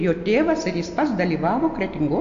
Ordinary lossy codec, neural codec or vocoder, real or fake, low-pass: AAC, 64 kbps; none; real; 7.2 kHz